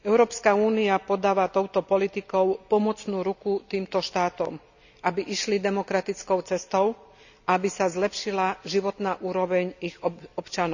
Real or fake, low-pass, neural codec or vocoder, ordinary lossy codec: real; 7.2 kHz; none; none